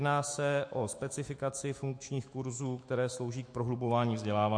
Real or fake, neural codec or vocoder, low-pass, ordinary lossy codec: fake; autoencoder, 48 kHz, 128 numbers a frame, DAC-VAE, trained on Japanese speech; 9.9 kHz; MP3, 48 kbps